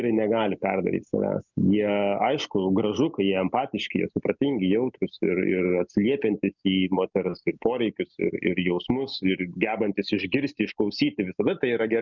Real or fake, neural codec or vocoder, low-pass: real; none; 7.2 kHz